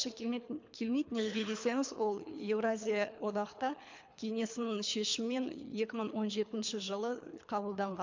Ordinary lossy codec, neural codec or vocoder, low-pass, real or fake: MP3, 64 kbps; codec, 24 kHz, 3 kbps, HILCodec; 7.2 kHz; fake